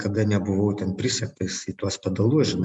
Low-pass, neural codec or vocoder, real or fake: 10.8 kHz; none; real